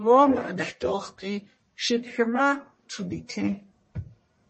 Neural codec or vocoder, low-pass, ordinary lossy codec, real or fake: codec, 44.1 kHz, 1.7 kbps, Pupu-Codec; 10.8 kHz; MP3, 32 kbps; fake